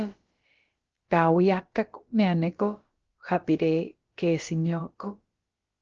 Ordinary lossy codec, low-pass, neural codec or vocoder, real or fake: Opus, 16 kbps; 7.2 kHz; codec, 16 kHz, about 1 kbps, DyCAST, with the encoder's durations; fake